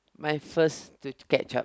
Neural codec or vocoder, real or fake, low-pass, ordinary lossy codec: none; real; none; none